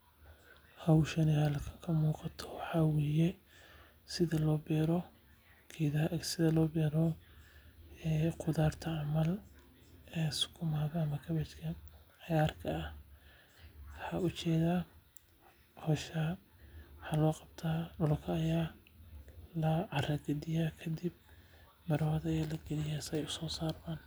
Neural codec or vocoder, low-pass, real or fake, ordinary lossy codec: none; none; real; none